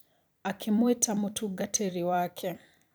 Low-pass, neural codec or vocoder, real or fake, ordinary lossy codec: none; vocoder, 44.1 kHz, 128 mel bands every 256 samples, BigVGAN v2; fake; none